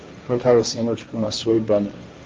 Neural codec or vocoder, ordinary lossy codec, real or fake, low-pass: codec, 16 kHz, 1.1 kbps, Voila-Tokenizer; Opus, 24 kbps; fake; 7.2 kHz